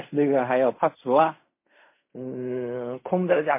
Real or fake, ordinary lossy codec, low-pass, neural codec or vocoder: fake; MP3, 24 kbps; 3.6 kHz; codec, 16 kHz in and 24 kHz out, 0.4 kbps, LongCat-Audio-Codec, fine tuned four codebook decoder